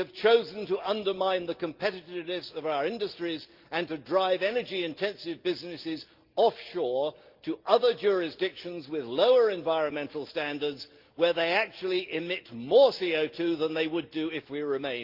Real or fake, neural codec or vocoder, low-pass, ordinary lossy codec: real; none; 5.4 kHz; Opus, 32 kbps